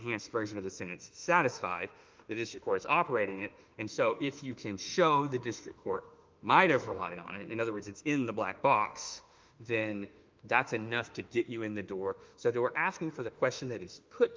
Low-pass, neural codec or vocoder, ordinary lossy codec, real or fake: 7.2 kHz; autoencoder, 48 kHz, 32 numbers a frame, DAC-VAE, trained on Japanese speech; Opus, 24 kbps; fake